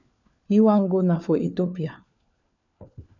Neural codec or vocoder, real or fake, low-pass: codec, 16 kHz, 4 kbps, FunCodec, trained on LibriTTS, 50 frames a second; fake; 7.2 kHz